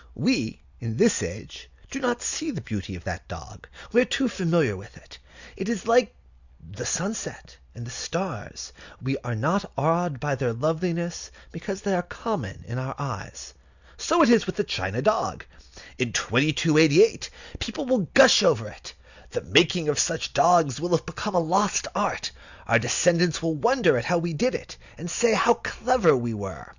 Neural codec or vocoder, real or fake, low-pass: none; real; 7.2 kHz